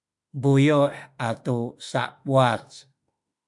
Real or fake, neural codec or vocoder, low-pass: fake; autoencoder, 48 kHz, 32 numbers a frame, DAC-VAE, trained on Japanese speech; 10.8 kHz